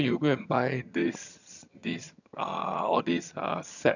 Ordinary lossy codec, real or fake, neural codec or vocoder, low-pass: none; fake; vocoder, 22.05 kHz, 80 mel bands, HiFi-GAN; 7.2 kHz